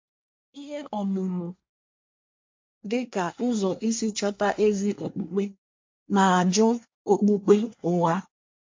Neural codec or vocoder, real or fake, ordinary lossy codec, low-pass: codec, 24 kHz, 1 kbps, SNAC; fake; MP3, 48 kbps; 7.2 kHz